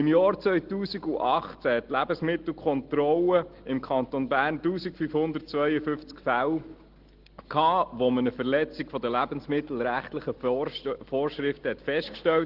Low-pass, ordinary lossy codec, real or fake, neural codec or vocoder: 5.4 kHz; Opus, 16 kbps; real; none